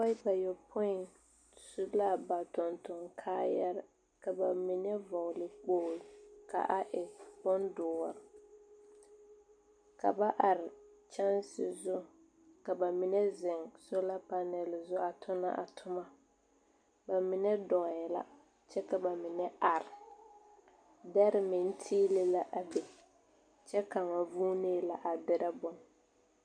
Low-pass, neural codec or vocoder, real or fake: 9.9 kHz; none; real